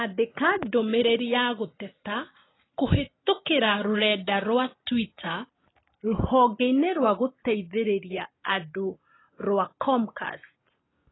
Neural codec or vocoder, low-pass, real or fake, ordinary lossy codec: none; 7.2 kHz; real; AAC, 16 kbps